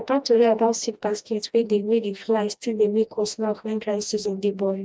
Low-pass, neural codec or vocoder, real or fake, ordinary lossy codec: none; codec, 16 kHz, 1 kbps, FreqCodec, smaller model; fake; none